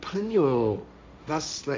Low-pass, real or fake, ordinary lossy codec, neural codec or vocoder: none; fake; none; codec, 16 kHz, 1.1 kbps, Voila-Tokenizer